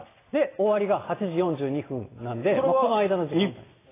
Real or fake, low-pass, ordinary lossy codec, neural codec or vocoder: real; 3.6 kHz; AAC, 16 kbps; none